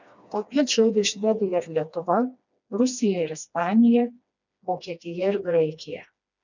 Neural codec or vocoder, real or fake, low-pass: codec, 16 kHz, 2 kbps, FreqCodec, smaller model; fake; 7.2 kHz